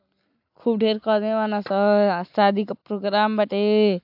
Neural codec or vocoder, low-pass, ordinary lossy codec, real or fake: none; 5.4 kHz; AAC, 48 kbps; real